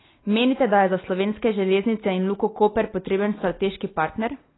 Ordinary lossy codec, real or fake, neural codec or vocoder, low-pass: AAC, 16 kbps; real; none; 7.2 kHz